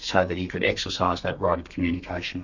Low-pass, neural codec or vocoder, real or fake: 7.2 kHz; codec, 32 kHz, 1.9 kbps, SNAC; fake